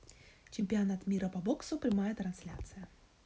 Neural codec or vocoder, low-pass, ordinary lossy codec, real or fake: none; none; none; real